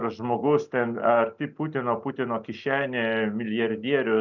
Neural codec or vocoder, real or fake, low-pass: none; real; 7.2 kHz